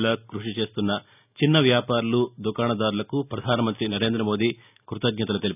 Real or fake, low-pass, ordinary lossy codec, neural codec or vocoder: real; 3.6 kHz; none; none